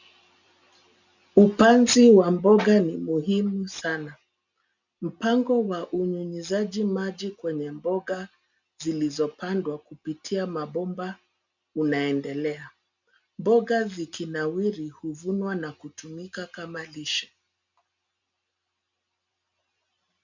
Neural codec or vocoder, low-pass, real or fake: none; 7.2 kHz; real